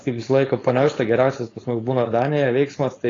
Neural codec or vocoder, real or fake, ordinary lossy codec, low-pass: codec, 16 kHz, 4.8 kbps, FACodec; fake; AAC, 32 kbps; 7.2 kHz